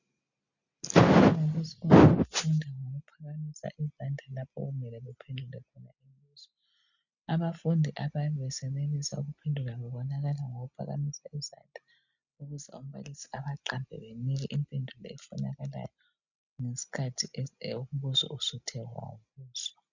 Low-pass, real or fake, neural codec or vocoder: 7.2 kHz; real; none